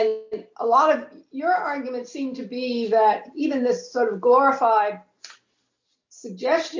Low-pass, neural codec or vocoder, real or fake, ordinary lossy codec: 7.2 kHz; none; real; MP3, 48 kbps